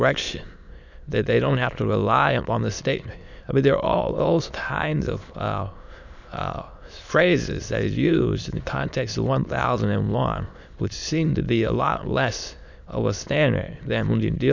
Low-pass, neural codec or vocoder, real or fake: 7.2 kHz; autoencoder, 22.05 kHz, a latent of 192 numbers a frame, VITS, trained on many speakers; fake